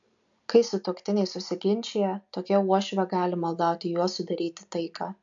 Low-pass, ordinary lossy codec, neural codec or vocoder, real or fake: 7.2 kHz; AAC, 64 kbps; none; real